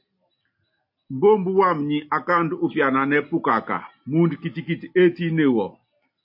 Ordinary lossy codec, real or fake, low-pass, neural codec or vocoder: MP3, 32 kbps; real; 5.4 kHz; none